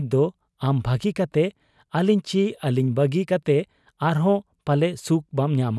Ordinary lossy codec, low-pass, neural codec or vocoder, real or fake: none; none; none; real